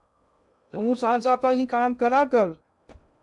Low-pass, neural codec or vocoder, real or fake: 10.8 kHz; codec, 16 kHz in and 24 kHz out, 0.6 kbps, FocalCodec, streaming, 2048 codes; fake